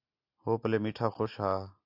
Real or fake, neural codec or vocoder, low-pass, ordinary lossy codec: real; none; 5.4 kHz; AAC, 32 kbps